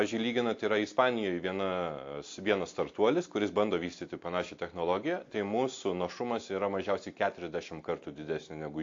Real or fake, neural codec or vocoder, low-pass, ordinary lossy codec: real; none; 7.2 kHz; AAC, 48 kbps